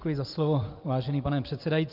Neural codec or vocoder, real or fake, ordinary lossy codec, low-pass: none; real; Opus, 24 kbps; 5.4 kHz